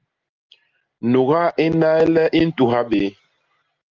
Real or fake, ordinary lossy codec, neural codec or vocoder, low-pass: real; Opus, 24 kbps; none; 7.2 kHz